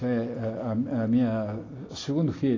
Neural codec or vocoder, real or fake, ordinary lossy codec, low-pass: none; real; AAC, 32 kbps; 7.2 kHz